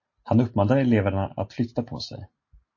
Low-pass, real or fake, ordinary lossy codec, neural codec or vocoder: 7.2 kHz; real; MP3, 32 kbps; none